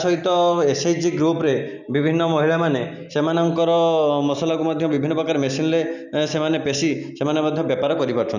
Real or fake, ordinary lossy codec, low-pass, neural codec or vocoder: real; none; 7.2 kHz; none